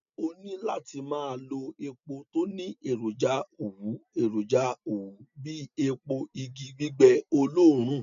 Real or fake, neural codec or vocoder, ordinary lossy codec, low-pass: real; none; none; 7.2 kHz